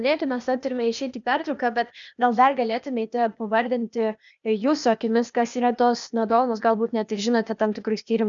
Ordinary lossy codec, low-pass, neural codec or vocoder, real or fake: MP3, 96 kbps; 7.2 kHz; codec, 16 kHz, 0.8 kbps, ZipCodec; fake